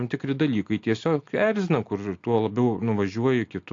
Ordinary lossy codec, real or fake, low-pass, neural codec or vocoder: AAC, 48 kbps; real; 7.2 kHz; none